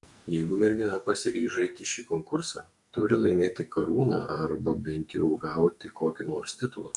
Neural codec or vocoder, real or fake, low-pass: codec, 44.1 kHz, 2.6 kbps, SNAC; fake; 10.8 kHz